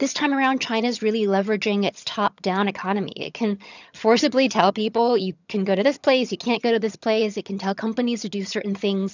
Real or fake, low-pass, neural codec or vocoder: fake; 7.2 kHz; vocoder, 22.05 kHz, 80 mel bands, HiFi-GAN